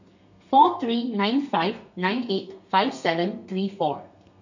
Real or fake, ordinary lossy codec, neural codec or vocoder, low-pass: fake; none; codec, 32 kHz, 1.9 kbps, SNAC; 7.2 kHz